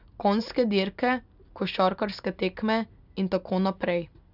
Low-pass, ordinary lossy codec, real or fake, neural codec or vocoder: 5.4 kHz; none; real; none